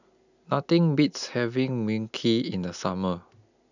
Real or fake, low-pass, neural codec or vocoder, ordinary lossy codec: real; 7.2 kHz; none; none